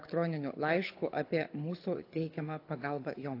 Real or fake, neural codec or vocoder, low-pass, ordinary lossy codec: fake; codec, 24 kHz, 6 kbps, HILCodec; 5.4 kHz; AAC, 32 kbps